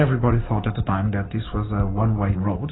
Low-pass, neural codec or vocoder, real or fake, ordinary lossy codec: 7.2 kHz; none; real; AAC, 16 kbps